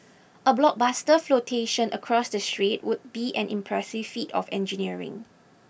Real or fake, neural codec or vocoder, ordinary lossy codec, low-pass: real; none; none; none